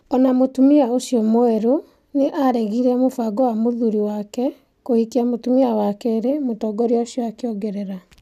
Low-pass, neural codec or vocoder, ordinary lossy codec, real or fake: 14.4 kHz; none; none; real